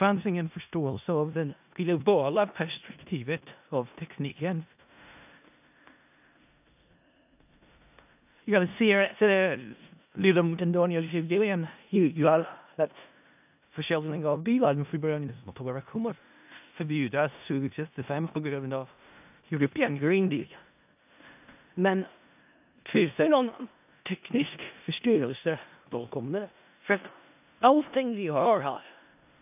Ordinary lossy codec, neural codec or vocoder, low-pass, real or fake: AAC, 32 kbps; codec, 16 kHz in and 24 kHz out, 0.4 kbps, LongCat-Audio-Codec, four codebook decoder; 3.6 kHz; fake